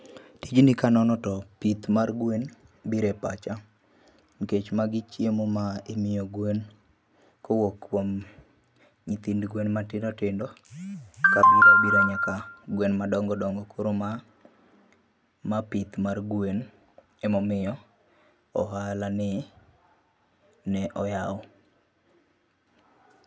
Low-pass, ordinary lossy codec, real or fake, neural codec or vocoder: none; none; real; none